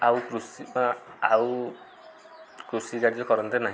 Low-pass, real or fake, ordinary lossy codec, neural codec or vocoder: none; real; none; none